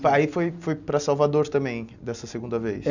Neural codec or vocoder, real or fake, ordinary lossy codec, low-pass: none; real; none; 7.2 kHz